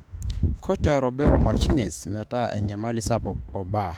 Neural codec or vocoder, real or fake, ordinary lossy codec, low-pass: autoencoder, 48 kHz, 32 numbers a frame, DAC-VAE, trained on Japanese speech; fake; none; 19.8 kHz